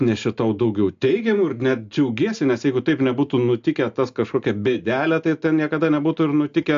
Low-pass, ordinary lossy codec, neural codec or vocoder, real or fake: 7.2 kHz; AAC, 64 kbps; none; real